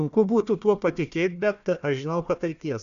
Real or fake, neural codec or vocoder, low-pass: fake; codec, 16 kHz, 1 kbps, FunCodec, trained on Chinese and English, 50 frames a second; 7.2 kHz